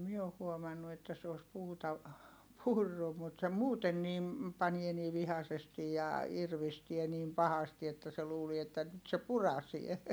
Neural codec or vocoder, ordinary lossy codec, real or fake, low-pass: none; none; real; none